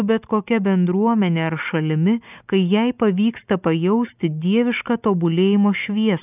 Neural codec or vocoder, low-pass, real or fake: none; 3.6 kHz; real